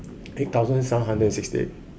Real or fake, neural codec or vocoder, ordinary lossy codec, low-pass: real; none; none; none